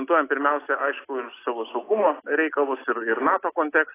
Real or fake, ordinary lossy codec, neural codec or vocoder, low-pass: real; AAC, 16 kbps; none; 3.6 kHz